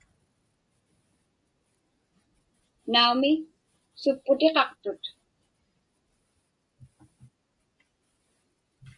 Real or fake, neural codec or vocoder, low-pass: real; none; 10.8 kHz